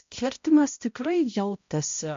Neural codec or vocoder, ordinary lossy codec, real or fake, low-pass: codec, 16 kHz, 0.5 kbps, X-Codec, HuBERT features, trained on balanced general audio; MP3, 48 kbps; fake; 7.2 kHz